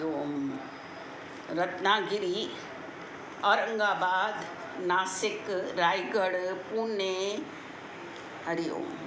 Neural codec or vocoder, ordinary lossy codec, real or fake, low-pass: none; none; real; none